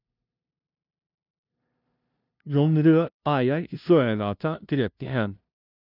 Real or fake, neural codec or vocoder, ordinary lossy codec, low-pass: fake; codec, 16 kHz, 0.5 kbps, FunCodec, trained on LibriTTS, 25 frames a second; none; 5.4 kHz